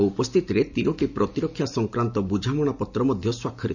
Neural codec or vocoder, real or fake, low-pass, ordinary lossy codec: none; real; 7.2 kHz; none